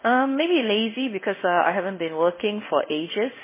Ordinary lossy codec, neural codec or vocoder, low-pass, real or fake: MP3, 16 kbps; codec, 16 kHz in and 24 kHz out, 1 kbps, XY-Tokenizer; 3.6 kHz; fake